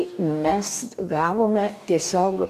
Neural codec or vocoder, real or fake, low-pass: codec, 44.1 kHz, 2.6 kbps, DAC; fake; 14.4 kHz